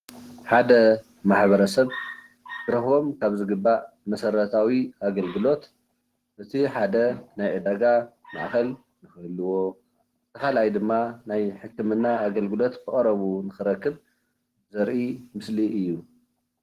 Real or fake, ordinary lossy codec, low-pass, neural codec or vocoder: real; Opus, 16 kbps; 14.4 kHz; none